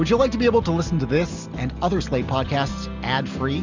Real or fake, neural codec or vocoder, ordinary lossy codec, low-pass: real; none; Opus, 64 kbps; 7.2 kHz